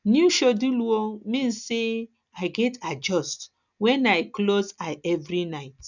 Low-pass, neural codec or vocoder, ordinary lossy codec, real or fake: 7.2 kHz; none; none; real